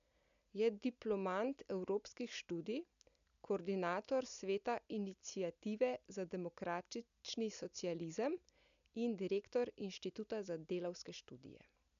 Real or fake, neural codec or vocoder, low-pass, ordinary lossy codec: real; none; 7.2 kHz; none